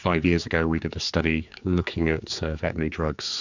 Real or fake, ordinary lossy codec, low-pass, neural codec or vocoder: fake; Opus, 64 kbps; 7.2 kHz; codec, 16 kHz, 4 kbps, X-Codec, HuBERT features, trained on general audio